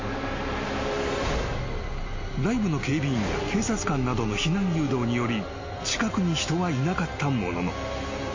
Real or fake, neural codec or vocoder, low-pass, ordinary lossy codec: real; none; 7.2 kHz; MP3, 32 kbps